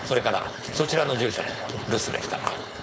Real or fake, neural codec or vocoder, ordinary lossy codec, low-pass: fake; codec, 16 kHz, 4.8 kbps, FACodec; none; none